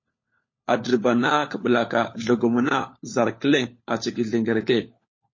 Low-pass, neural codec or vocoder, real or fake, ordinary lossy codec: 7.2 kHz; codec, 16 kHz, 4 kbps, FunCodec, trained on LibriTTS, 50 frames a second; fake; MP3, 32 kbps